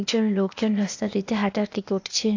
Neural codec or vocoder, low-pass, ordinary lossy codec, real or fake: codec, 16 kHz, 0.8 kbps, ZipCodec; 7.2 kHz; AAC, 48 kbps; fake